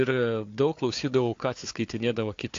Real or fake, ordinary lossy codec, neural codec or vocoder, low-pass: fake; AAC, 48 kbps; codec, 16 kHz, 4 kbps, FunCodec, trained on Chinese and English, 50 frames a second; 7.2 kHz